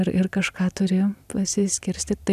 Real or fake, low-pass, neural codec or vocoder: real; 14.4 kHz; none